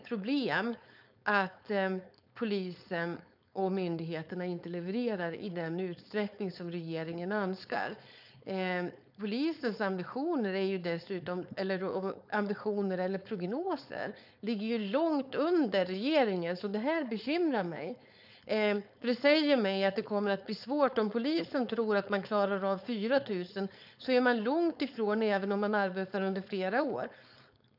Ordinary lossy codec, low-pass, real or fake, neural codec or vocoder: none; 5.4 kHz; fake; codec, 16 kHz, 4.8 kbps, FACodec